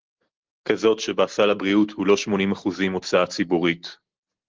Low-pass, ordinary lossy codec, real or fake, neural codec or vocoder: 7.2 kHz; Opus, 16 kbps; real; none